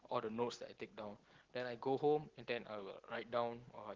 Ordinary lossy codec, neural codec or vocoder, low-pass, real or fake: Opus, 16 kbps; none; 7.2 kHz; real